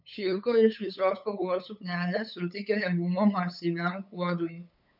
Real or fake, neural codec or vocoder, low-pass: fake; codec, 16 kHz, 8 kbps, FunCodec, trained on LibriTTS, 25 frames a second; 5.4 kHz